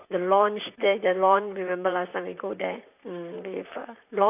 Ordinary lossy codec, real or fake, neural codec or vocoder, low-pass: none; fake; vocoder, 44.1 kHz, 128 mel bands, Pupu-Vocoder; 3.6 kHz